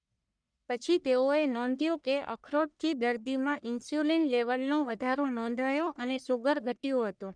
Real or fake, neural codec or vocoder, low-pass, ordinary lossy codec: fake; codec, 44.1 kHz, 1.7 kbps, Pupu-Codec; 9.9 kHz; none